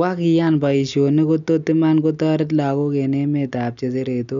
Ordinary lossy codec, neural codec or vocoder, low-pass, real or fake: none; none; 7.2 kHz; real